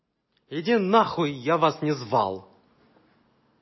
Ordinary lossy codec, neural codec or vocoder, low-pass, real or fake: MP3, 24 kbps; none; 7.2 kHz; real